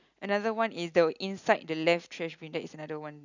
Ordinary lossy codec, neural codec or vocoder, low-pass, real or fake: none; none; 7.2 kHz; real